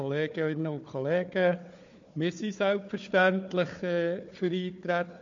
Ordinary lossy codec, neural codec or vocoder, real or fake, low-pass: MP3, 48 kbps; codec, 16 kHz, 4 kbps, FunCodec, trained on Chinese and English, 50 frames a second; fake; 7.2 kHz